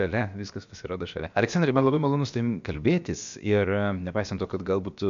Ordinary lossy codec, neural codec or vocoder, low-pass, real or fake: MP3, 64 kbps; codec, 16 kHz, about 1 kbps, DyCAST, with the encoder's durations; 7.2 kHz; fake